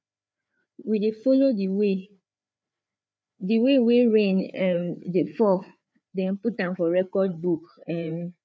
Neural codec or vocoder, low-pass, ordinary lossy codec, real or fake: codec, 16 kHz, 4 kbps, FreqCodec, larger model; none; none; fake